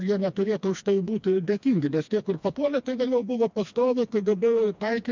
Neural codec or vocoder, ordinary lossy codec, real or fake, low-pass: codec, 16 kHz, 2 kbps, FreqCodec, smaller model; MP3, 64 kbps; fake; 7.2 kHz